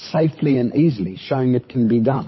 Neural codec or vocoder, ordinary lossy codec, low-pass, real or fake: codec, 16 kHz, 16 kbps, FunCodec, trained on LibriTTS, 50 frames a second; MP3, 24 kbps; 7.2 kHz; fake